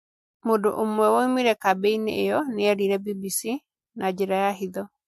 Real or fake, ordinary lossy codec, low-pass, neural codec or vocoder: real; MP3, 64 kbps; 14.4 kHz; none